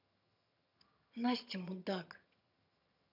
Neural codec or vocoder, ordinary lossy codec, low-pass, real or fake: vocoder, 22.05 kHz, 80 mel bands, HiFi-GAN; none; 5.4 kHz; fake